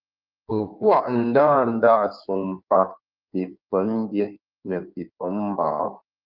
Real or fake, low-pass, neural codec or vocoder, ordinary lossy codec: fake; 5.4 kHz; codec, 16 kHz in and 24 kHz out, 1.1 kbps, FireRedTTS-2 codec; Opus, 24 kbps